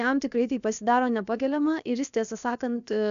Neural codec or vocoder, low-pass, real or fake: codec, 16 kHz, 0.7 kbps, FocalCodec; 7.2 kHz; fake